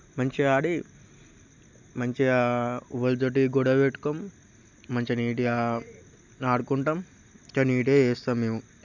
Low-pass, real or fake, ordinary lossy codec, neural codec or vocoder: 7.2 kHz; real; none; none